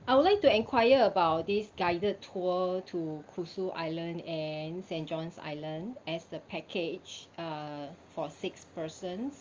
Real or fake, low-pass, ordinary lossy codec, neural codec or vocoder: real; 7.2 kHz; Opus, 32 kbps; none